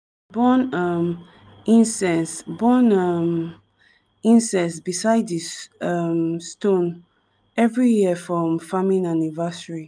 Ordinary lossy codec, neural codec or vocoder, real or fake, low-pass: none; none; real; none